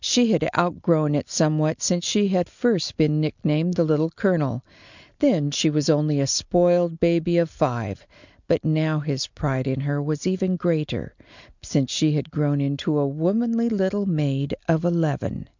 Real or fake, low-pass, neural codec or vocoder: real; 7.2 kHz; none